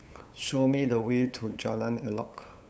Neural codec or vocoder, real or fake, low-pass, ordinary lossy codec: codec, 16 kHz, 8 kbps, FunCodec, trained on LibriTTS, 25 frames a second; fake; none; none